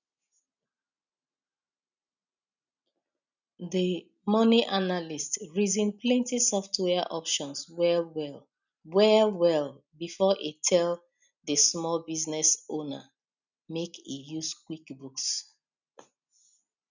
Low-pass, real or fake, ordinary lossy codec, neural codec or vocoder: 7.2 kHz; real; none; none